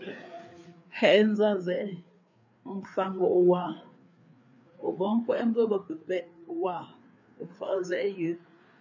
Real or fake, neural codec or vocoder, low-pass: fake; codec, 16 kHz, 4 kbps, FreqCodec, larger model; 7.2 kHz